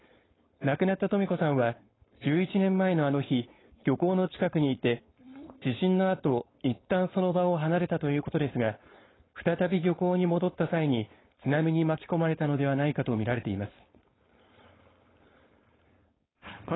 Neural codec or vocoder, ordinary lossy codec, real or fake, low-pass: codec, 16 kHz, 4.8 kbps, FACodec; AAC, 16 kbps; fake; 7.2 kHz